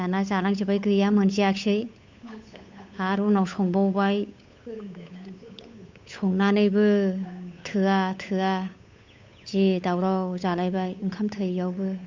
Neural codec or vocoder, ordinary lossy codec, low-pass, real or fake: codec, 16 kHz, 8 kbps, FunCodec, trained on Chinese and English, 25 frames a second; MP3, 64 kbps; 7.2 kHz; fake